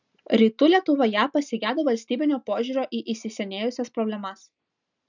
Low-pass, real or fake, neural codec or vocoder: 7.2 kHz; real; none